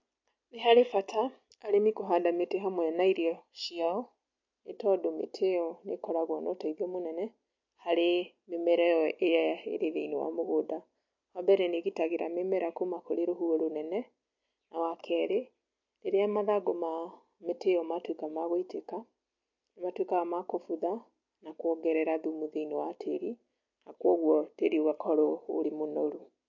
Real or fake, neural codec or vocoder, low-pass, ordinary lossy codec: real; none; 7.2 kHz; MP3, 48 kbps